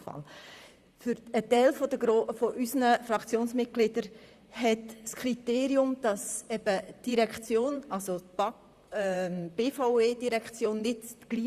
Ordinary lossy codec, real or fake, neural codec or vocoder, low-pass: Opus, 64 kbps; fake; vocoder, 44.1 kHz, 128 mel bands, Pupu-Vocoder; 14.4 kHz